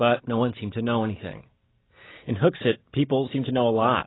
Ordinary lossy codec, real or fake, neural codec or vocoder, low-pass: AAC, 16 kbps; real; none; 7.2 kHz